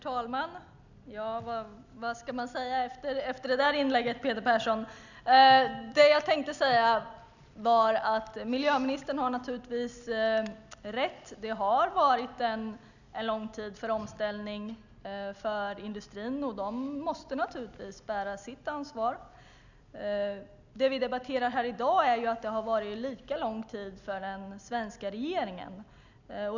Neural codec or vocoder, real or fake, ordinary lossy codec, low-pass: none; real; none; 7.2 kHz